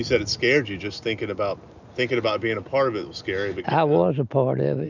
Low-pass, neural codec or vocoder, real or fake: 7.2 kHz; none; real